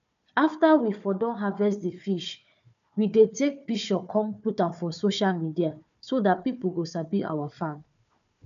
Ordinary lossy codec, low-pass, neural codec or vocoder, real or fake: MP3, 96 kbps; 7.2 kHz; codec, 16 kHz, 4 kbps, FunCodec, trained on Chinese and English, 50 frames a second; fake